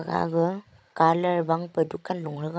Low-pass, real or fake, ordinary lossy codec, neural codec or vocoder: none; fake; none; codec, 16 kHz, 16 kbps, FreqCodec, larger model